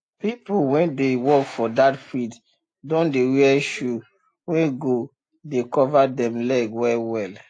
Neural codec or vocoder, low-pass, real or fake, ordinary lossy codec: none; 9.9 kHz; real; AAC, 48 kbps